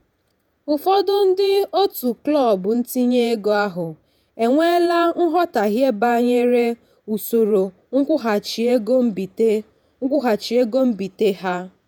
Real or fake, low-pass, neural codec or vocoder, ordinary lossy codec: fake; none; vocoder, 48 kHz, 128 mel bands, Vocos; none